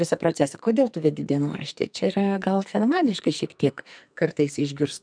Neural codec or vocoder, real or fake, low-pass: codec, 44.1 kHz, 2.6 kbps, SNAC; fake; 9.9 kHz